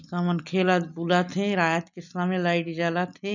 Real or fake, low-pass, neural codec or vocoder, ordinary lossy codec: real; 7.2 kHz; none; none